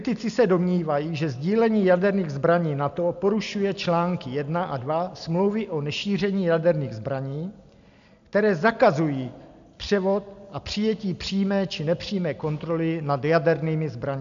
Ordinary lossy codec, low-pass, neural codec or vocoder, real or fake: AAC, 96 kbps; 7.2 kHz; none; real